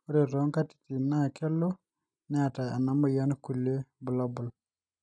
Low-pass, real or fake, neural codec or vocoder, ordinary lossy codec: 9.9 kHz; real; none; none